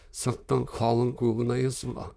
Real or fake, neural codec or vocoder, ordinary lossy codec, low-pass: fake; autoencoder, 22.05 kHz, a latent of 192 numbers a frame, VITS, trained on many speakers; none; none